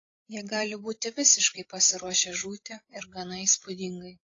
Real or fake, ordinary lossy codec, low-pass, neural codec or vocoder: fake; AAC, 32 kbps; 7.2 kHz; codec, 16 kHz, 16 kbps, FreqCodec, larger model